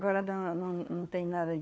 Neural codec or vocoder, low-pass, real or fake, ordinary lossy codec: codec, 16 kHz, 4 kbps, FunCodec, trained on LibriTTS, 50 frames a second; none; fake; none